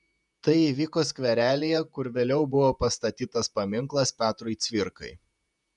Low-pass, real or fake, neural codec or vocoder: 10.8 kHz; fake; vocoder, 48 kHz, 128 mel bands, Vocos